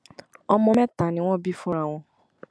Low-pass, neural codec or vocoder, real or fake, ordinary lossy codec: none; none; real; none